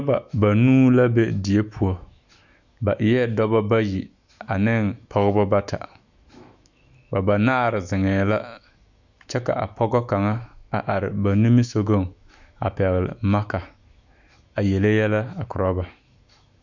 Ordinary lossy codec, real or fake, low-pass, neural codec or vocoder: Opus, 64 kbps; fake; 7.2 kHz; autoencoder, 48 kHz, 128 numbers a frame, DAC-VAE, trained on Japanese speech